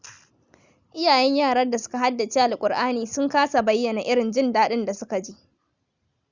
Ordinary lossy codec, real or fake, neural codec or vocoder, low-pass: Opus, 64 kbps; real; none; 7.2 kHz